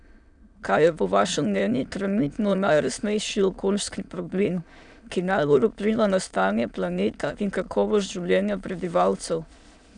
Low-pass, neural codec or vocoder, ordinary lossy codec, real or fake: 9.9 kHz; autoencoder, 22.05 kHz, a latent of 192 numbers a frame, VITS, trained on many speakers; none; fake